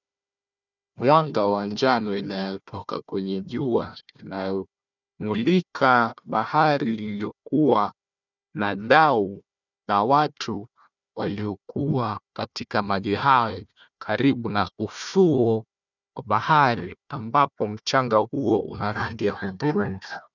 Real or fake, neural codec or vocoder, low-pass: fake; codec, 16 kHz, 1 kbps, FunCodec, trained on Chinese and English, 50 frames a second; 7.2 kHz